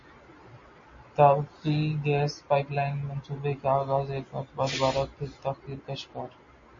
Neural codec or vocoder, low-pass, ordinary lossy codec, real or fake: none; 7.2 kHz; MP3, 32 kbps; real